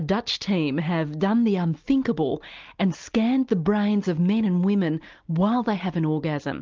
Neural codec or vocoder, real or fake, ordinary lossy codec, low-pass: none; real; Opus, 32 kbps; 7.2 kHz